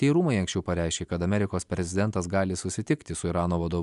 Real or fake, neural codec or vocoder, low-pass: real; none; 10.8 kHz